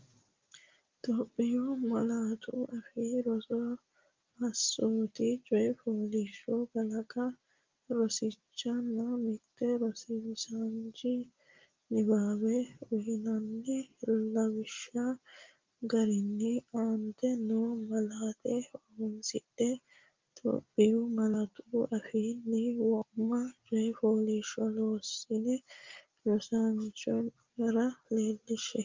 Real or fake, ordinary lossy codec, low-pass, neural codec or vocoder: real; Opus, 32 kbps; 7.2 kHz; none